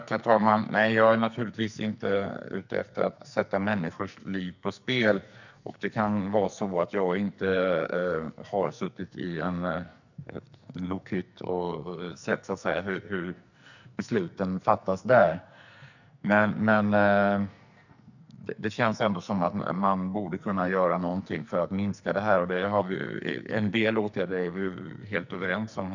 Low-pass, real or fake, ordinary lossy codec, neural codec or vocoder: 7.2 kHz; fake; none; codec, 44.1 kHz, 2.6 kbps, SNAC